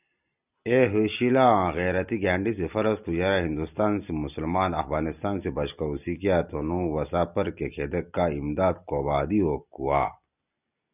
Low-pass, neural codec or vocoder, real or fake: 3.6 kHz; none; real